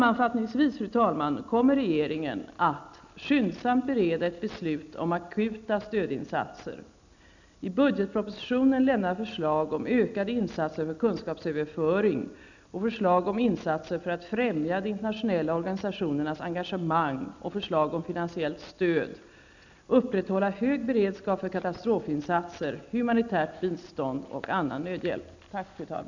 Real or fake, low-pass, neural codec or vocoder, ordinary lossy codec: real; 7.2 kHz; none; none